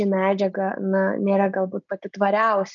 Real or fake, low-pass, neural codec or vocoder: real; 7.2 kHz; none